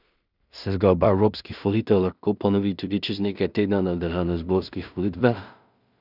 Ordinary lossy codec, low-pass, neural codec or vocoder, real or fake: none; 5.4 kHz; codec, 16 kHz in and 24 kHz out, 0.4 kbps, LongCat-Audio-Codec, two codebook decoder; fake